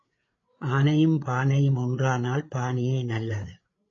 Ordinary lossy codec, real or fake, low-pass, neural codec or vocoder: AAC, 32 kbps; fake; 7.2 kHz; codec, 16 kHz, 8 kbps, FreqCodec, larger model